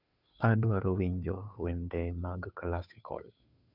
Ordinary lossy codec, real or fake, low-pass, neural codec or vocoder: none; fake; 5.4 kHz; codec, 16 kHz, 2 kbps, FunCodec, trained on Chinese and English, 25 frames a second